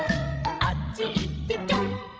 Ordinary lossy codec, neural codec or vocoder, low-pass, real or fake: none; codec, 16 kHz, 16 kbps, FreqCodec, larger model; none; fake